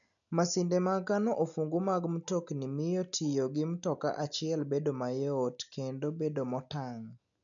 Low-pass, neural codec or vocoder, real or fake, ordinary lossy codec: 7.2 kHz; none; real; none